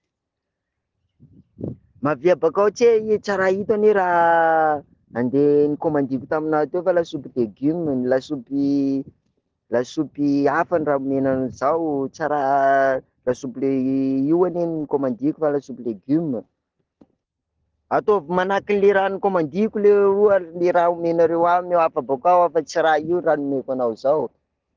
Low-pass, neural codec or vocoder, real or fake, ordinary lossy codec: 7.2 kHz; none; real; Opus, 16 kbps